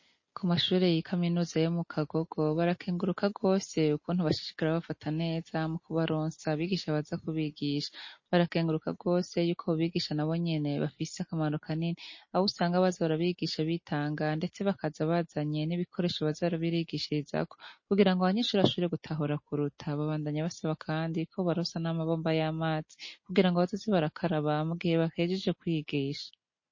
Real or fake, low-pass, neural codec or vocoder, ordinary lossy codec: real; 7.2 kHz; none; MP3, 32 kbps